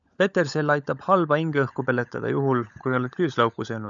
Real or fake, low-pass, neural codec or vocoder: fake; 7.2 kHz; codec, 16 kHz, 16 kbps, FunCodec, trained on LibriTTS, 50 frames a second